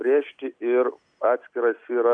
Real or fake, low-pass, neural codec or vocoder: real; 9.9 kHz; none